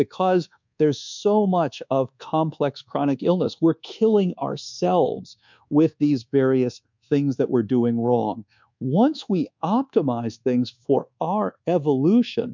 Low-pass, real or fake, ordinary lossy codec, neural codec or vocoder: 7.2 kHz; fake; MP3, 64 kbps; codec, 24 kHz, 1.2 kbps, DualCodec